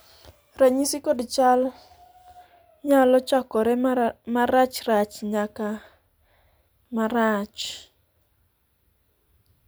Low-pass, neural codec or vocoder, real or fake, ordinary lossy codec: none; none; real; none